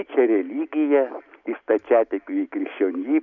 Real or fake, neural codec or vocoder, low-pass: real; none; 7.2 kHz